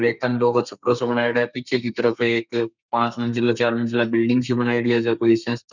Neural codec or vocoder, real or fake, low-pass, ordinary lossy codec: codec, 32 kHz, 1.9 kbps, SNAC; fake; 7.2 kHz; none